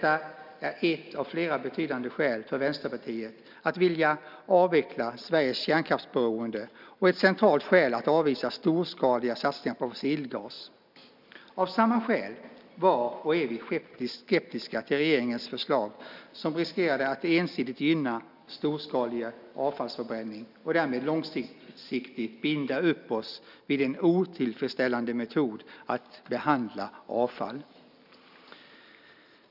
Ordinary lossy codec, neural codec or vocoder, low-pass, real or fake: none; none; 5.4 kHz; real